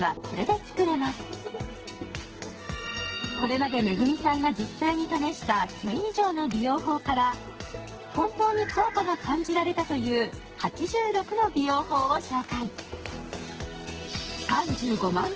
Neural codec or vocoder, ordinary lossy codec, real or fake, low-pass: codec, 44.1 kHz, 2.6 kbps, SNAC; Opus, 16 kbps; fake; 7.2 kHz